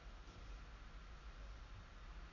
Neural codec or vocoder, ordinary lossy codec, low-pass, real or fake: none; none; 7.2 kHz; real